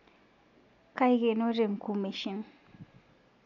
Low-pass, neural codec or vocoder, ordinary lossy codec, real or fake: 7.2 kHz; none; none; real